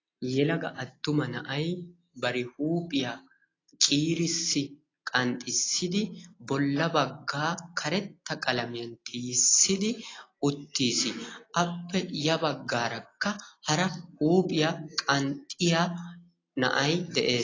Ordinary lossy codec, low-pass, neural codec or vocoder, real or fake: AAC, 32 kbps; 7.2 kHz; none; real